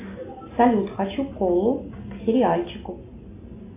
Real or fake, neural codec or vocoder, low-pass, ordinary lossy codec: real; none; 3.6 kHz; AAC, 24 kbps